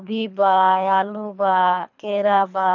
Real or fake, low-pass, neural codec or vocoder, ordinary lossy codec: fake; 7.2 kHz; codec, 24 kHz, 3 kbps, HILCodec; none